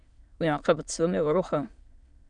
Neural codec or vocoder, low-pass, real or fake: autoencoder, 22.05 kHz, a latent of 192 numbers a frame, VITS, trained on many speakers; 9.9 kHz; fake